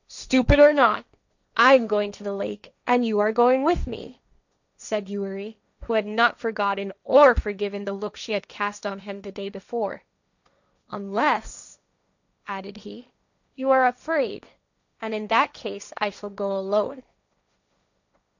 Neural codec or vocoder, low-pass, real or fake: codec, 16 kHz, 1.1 kbps, Voila-Tokenizer; 7.2 kHz; fake